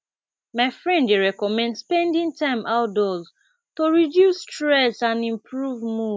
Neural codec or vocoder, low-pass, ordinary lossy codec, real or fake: none; none; none; real